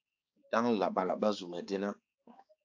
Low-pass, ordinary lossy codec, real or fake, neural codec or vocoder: 7.2 kHz; AAC, 48 kbps; fake; codec, 16 kHz, 2 kbps, X-Codec, HuBERT features, trained on balanced general audio